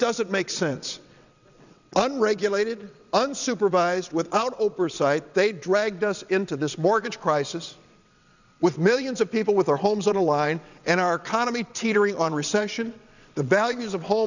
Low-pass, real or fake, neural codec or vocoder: 7.2 kHz; fake; vocoder, 22.05 kHz, 80 mel bands, WaveNeXt